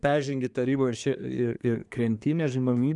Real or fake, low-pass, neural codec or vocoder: fake; 10.8 kHz; codec, 24 kHz, 1 kbps, SNAC